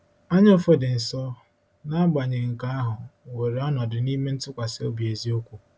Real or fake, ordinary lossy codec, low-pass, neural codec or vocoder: real; none; none; none